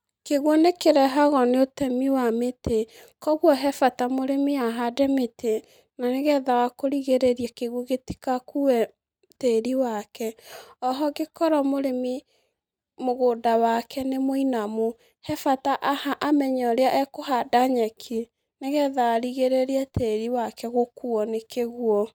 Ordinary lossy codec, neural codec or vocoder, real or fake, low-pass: none; none; real; none